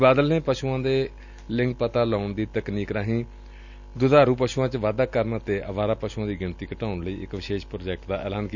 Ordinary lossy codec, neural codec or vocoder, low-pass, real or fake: none; none; 7.2 kHz; real